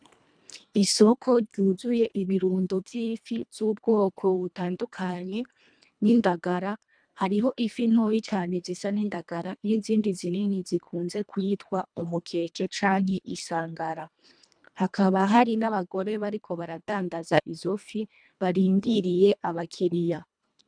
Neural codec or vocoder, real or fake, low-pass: codec, 24 kHz, 1.5 kbps, HILCodec; fake; 9.9 kHz